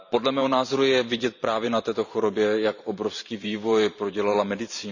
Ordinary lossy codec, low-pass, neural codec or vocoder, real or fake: none; 7.2 kHz; vocoder, 44.1 kHz, 128 mel bands every 512 samples, BigVGAN v2; fake